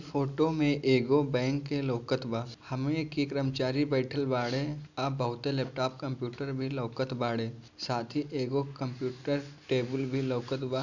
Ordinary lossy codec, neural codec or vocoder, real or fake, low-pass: none; none; real; 7.2 kHz